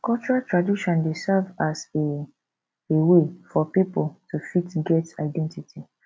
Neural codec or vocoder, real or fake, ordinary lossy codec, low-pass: none; real; none; none